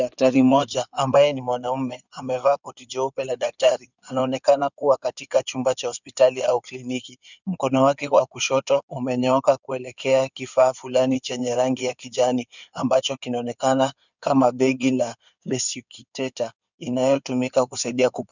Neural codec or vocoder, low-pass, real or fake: codec, 16 kHz in and 24 kHz out, 2.2 kbps, FireRedTTS-2 codec; 7.2 kHz; fake